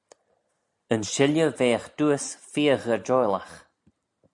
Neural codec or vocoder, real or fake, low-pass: none; real; 10.8 kHz